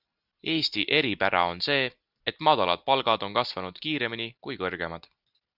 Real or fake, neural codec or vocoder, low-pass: real; none; 5.4 kHz